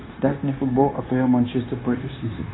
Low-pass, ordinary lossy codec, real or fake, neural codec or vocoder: 7.2 kHz; AAC, 16 kbps; fake; codec, 16 kHz, 0.9 kbps, LongCat-Audio-Codec